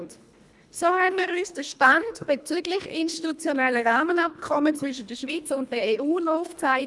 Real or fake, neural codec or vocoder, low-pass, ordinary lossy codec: fake; codec, 24 kHz, 1.5 kbps, HILCodec; none; none